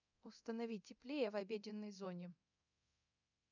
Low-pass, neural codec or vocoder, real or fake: 7.2 kHz; codec, 24 kHz, 0.9 kbps, DualCodec; fake